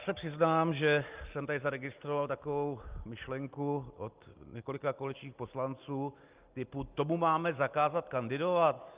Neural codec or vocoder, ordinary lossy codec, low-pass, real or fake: none; Opus, 24 kbps; 3.6 kHz; real